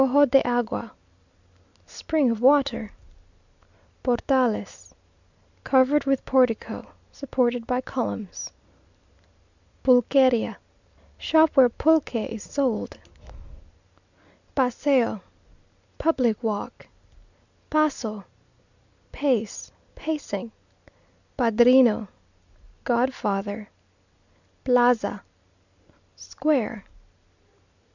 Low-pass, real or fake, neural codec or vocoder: 7.2 kHz; real; none